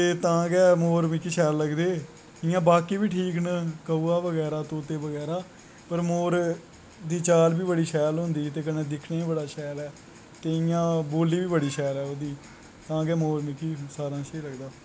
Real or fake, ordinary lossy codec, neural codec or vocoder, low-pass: real; none; none; none